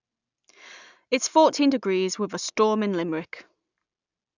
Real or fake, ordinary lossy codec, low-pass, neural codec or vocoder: real; none; 7.2 kHz; none